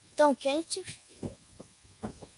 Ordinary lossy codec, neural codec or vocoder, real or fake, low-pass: AAC, 64 kbps; codec, 24 kHz, 1.2 kbps, DualCodec; fake; 10.8 kHz